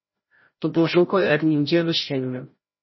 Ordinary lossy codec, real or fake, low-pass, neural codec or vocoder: MP3, 24 kbps; fake; 7.2 kHz; codec, 16 kHz, 0.5 kbps, FreqCodec, larger model